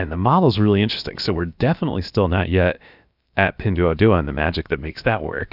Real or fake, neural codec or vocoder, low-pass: fake; codec, 16 kHz, about 1 kbps, DyCAST, with the encoder's durations; 5.4 kHz